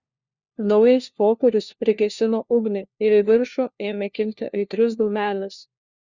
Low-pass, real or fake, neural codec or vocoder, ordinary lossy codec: 7.2 kHz; fake; codec, 16 kHz, 1 kbps, FunCodec, trained on LibriTTS, 50 frames a second; Opus, 64 kbps